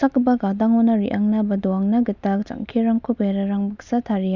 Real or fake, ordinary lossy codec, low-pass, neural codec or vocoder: real; none; 7.2 kHz; none